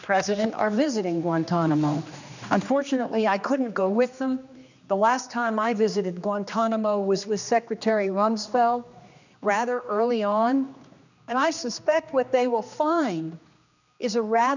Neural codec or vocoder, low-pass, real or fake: codec, 16 kHz, 2 kbps, X-Codec, HuBERT features, trained on general audio; 7.2 kHz; fake